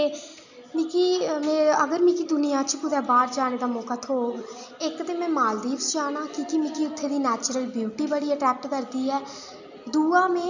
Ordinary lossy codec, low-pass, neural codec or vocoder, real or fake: none; 7.2 kHz; none; real